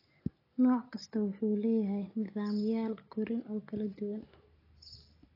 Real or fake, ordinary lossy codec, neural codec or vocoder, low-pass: real; AAC, 24 kbps; none; 5.4 kHz